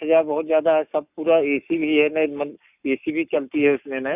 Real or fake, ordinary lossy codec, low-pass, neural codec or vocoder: fake; none; 3.6 kHz; codec, 44.1 kHz, 7.8 kbps, Pupu-Codec